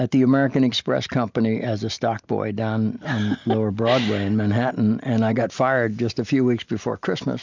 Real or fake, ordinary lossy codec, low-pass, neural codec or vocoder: real; MP3, 64 kbps; 7.2 kHz; none